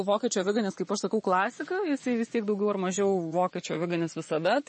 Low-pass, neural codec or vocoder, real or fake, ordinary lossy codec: 10.8 kHz; vocoder, 24 kHz, 100 mel bands, Vocos; fake; MP3, 32 kbps